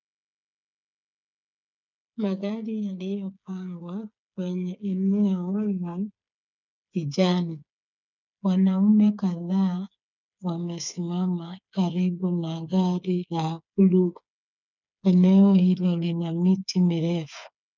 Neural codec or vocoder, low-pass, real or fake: codec, 16 kHz, 8 kbps, FreqCodec, smaller model; 7.2 kHz; fake